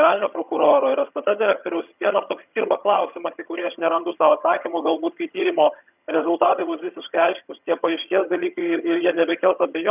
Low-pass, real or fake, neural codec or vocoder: 3.6 kHz; fake; vocoder, 22.05 kHz, 80 mel bands, HiFi-GAN